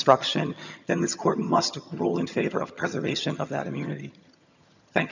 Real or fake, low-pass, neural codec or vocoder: fake; 7.2 kHz; vocoder, 22.05 kHz, 80 mel bands, HiFi-GAN